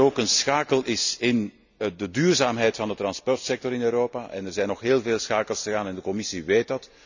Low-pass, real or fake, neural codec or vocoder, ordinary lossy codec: 7.2 kHz; real; none; none